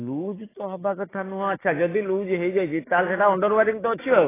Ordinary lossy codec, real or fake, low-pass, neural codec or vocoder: AAC, 16 kbps; fake; 3.6 kHz; codec, 16 kHz, 6 kbps, DAC